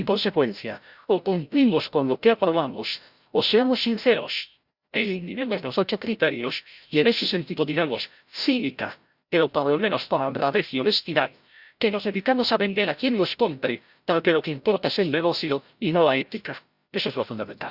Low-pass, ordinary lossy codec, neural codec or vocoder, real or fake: 5.4 kHz; Opus, 64 kbps; codec, 16 kHz, 0.5 kbps, FreqCodec, larger model; fake